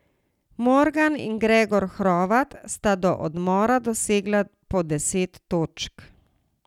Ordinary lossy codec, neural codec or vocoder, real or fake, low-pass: none; none; real; 19.8 kHz